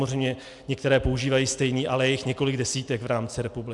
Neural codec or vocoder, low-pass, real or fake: none; 10.8 kHz; real